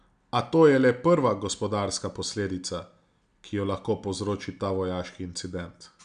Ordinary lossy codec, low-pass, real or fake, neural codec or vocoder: none; 9.9 kHz; real; none